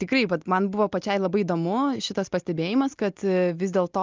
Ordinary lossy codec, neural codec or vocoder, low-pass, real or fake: Opus, 24 kbps; none; 7.2 kHz; real